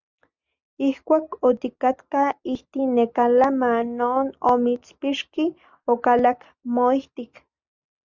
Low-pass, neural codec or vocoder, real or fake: 7.2 kHz; none; real